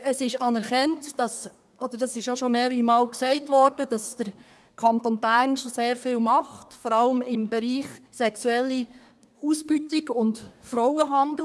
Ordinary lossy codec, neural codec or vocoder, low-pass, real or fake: none; codec, 24 kHz, 1 kbps, SNAC; none; fake